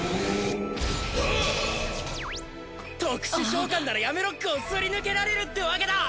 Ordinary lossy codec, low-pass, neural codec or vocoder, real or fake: none; none; none; real